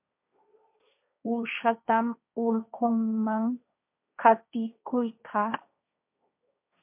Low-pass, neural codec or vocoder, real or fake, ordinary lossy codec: 3.6 kHz; codec, 16 kHz, 1.1 kbps, Voila-Tokenizer; fake; MP3, 32 kbps